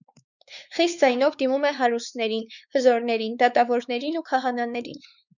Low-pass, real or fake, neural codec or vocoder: 7.2 kHz; fake; codec, 16 kHz, 4 kbps, X-Codec, WavLM features, trained on Multilingual LibriSpeech